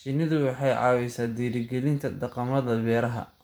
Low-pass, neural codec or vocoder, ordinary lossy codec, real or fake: none; vocoder, 44.1 kHz, 128 mel bands every 512 samples, BigVGAN v2; none; fake